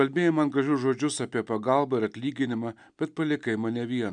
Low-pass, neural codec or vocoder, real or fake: 9.9 kHz; none; real